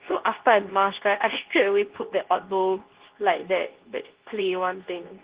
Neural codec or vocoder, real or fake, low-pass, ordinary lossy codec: codec, 24 kHz, 0.9 kbps, WavTokenizer, medium speech release version 2; fake; 3.6 kHz; Opus, 16 kbps